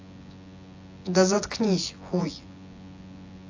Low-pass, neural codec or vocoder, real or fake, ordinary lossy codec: 7.2 kHz; vocoder, 24 kHz, 100 mel bands, Vocos; fake; AAC, 48 kbps